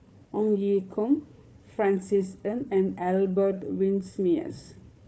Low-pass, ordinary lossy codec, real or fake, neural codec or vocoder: none; none; fake; codec, 16 kHz, 4 kbps, FunCodec, trained on Chinese and English, 50 frames a second